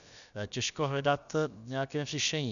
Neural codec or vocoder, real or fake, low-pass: codec, 16 kHz, about 1 kbps, DyCAST, with the encoder's durations; fake; 7.2 kHz